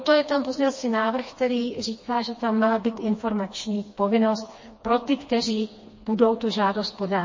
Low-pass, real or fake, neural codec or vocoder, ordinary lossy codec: 7.2 kHz; fake; codec, 16 kHz, 2 kbps, FreqCodec, smaller model; MP3, 32 kbps